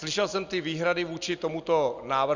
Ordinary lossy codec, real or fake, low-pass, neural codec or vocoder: Opus, 64 kbps; real; 7.2 kHz; none